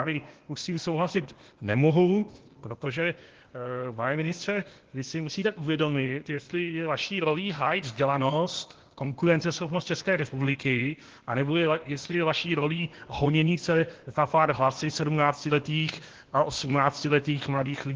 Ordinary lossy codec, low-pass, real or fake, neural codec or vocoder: Opus, 16 kbps; 7.2 kHz; fake; codec, 16 kHz, 0.8 kbps, ZipCodec